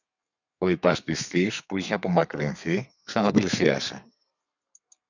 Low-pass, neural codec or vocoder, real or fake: 7.2 kHz; codec, 32 kHz, 1.9 kbps, SNAC; fake